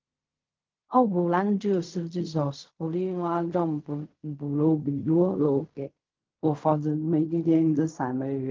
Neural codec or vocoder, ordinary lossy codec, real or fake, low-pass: codec, 16 kHz in and 24 kHz out, 0.4 kbps, LongCat-Audio-Codec, fine tuned four codebook decoder; Opus, 32 kbps; fake; 7.2 kHz